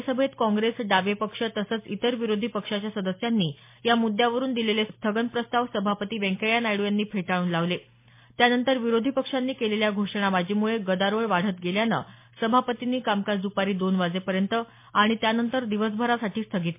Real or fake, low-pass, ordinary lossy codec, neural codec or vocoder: real; 3.6 kHz; MP3, 24 kbps; none